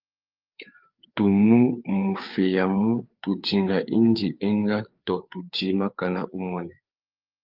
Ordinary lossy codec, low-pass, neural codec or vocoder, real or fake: Opus, 32 kbps; 5.4 kHz; codec, 16 kHz, 4 kbps, FreqCodec, larger model; fake